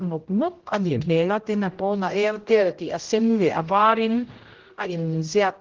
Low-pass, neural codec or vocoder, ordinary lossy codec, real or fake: 7.2 kHz; codec, 16 kHz, 0.5 kbps, X-Codec, HuBERT features, trained on general audio; Opus, 16 kbps; fake